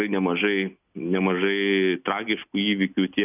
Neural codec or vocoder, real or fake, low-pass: none; real; 3.6 kHz